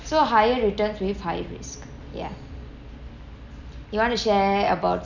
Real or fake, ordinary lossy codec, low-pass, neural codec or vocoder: real; none; 7.2 kHz; none